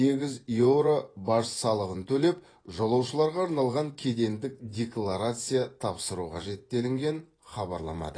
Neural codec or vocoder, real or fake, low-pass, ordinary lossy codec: vocoder, 24 kHz, 100 mel bands, Vocos; fake; 9.9 kHz; AAC, 32 kbps